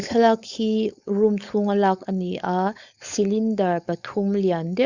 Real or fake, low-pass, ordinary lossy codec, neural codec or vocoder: fake; 7.2 kHz; Opus, 64 kbps; codec, 16 kHz, 4.8 kbps, FACodec